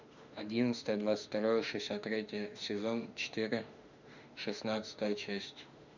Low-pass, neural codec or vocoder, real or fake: 7.2 kHz; autoencoder, 48 kHz, 32 numbers a frame, DAC-VAE, trained on Japanese speech; fake